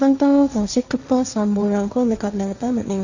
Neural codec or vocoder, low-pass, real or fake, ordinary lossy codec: codec, 16 kHz, 1.1 kbps, Voila-Tokenizer; 7.2 kHz; fake; none